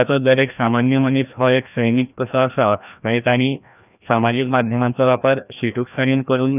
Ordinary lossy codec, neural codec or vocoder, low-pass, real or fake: none; codec, 16 kHz, 1 kbps, FreqCodec, larger model; 3.6 kHz; fake